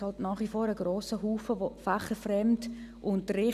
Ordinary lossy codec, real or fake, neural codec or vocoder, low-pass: none; real; none; 14.4 kHz